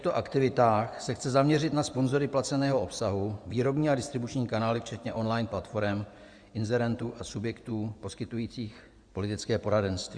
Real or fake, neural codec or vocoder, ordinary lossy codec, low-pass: fake; vocoder, 24 kHz, 100 mel bands, Vocos; Opus, 64 kbps; 9.9 kHz